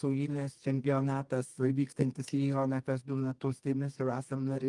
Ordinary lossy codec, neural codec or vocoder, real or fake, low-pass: Opus, 32 kbps; codec, 24 kHz, 0.9 kbps, WavTokenizer, medium music audio release; fake; 10.8 kHz